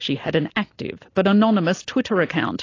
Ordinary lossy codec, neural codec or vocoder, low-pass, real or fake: AAC, 32 kbps; none; 7.2 kHz; real